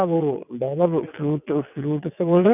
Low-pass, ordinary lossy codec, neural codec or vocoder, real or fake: 3.6 kHz; none; vocoder, 22.05 kHz, 80 mel bands, WaveNeXt; fake